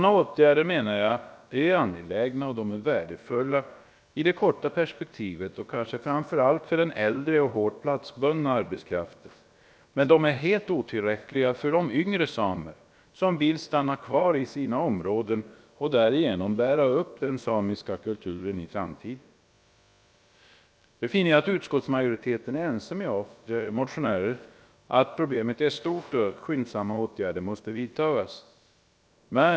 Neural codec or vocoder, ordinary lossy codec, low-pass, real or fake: codec, 16 kHz, about 1 kbps, DyCAST, with the encoder's durations; none; none; fake